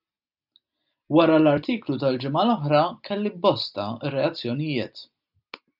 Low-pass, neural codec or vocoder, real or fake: 5.4 kHz; none; real